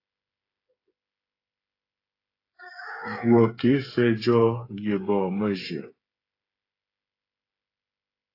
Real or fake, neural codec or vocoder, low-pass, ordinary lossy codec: fake; codec, 16 kHz, 8 kbps, FreqCodec, smaller model; 5.4 kHz; AAC, 32 kbps